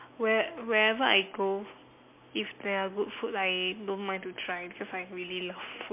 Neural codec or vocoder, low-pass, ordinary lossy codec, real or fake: none; 3.6 kHz; MP3, 24 kbps; real